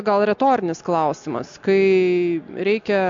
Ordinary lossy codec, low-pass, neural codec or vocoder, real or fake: MP3, 48 kbps; 7.2 kHz; none; real